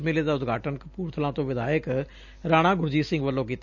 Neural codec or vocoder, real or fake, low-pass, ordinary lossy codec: none; real; 7.2 kHz; none